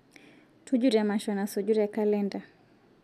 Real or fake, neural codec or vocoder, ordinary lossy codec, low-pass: real; none; none; 14.4 kHz